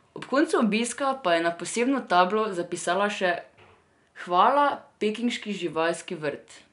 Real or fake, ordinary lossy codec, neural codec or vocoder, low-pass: real; none; none; 10.8 kHz